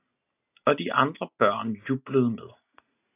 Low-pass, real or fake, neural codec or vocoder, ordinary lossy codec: 3.6 kHz; real; none; AAC, 24 kbps